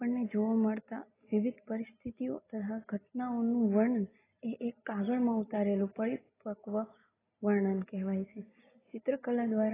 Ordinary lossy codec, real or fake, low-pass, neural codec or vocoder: AAC, 16 kbps; real; 3.6 kHz; none